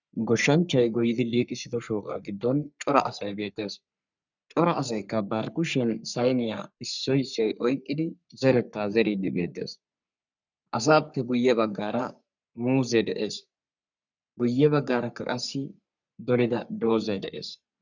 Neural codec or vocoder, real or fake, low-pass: codec, 44.1 kHz, 3.4 kbps, Pupu-Codec; fake; 7.2 kHz